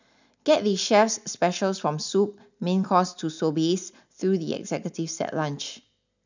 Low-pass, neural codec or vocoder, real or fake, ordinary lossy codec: 7.2 kHz; none; real; none